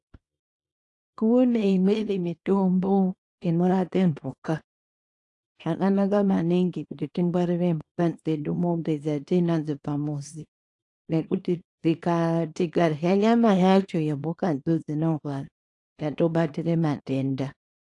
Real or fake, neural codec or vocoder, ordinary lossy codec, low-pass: fake; codec, 24 kHz, 0.9 kbps, WavTokenizer, small release; AAC, 48 kbps; 10.8 kHz